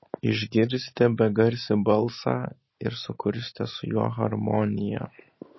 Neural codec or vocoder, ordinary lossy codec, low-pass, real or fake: none; MP3, 24 kbps; 7.2 kHz; real